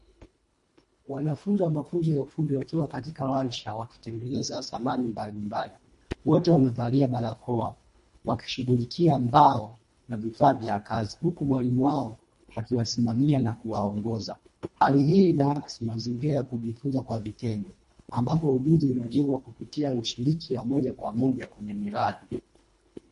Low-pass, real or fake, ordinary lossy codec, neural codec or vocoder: 10.8 kHz; fake; MP3, 48 kbps; codec, 24 kHz, 1.5 kbps, HILCodec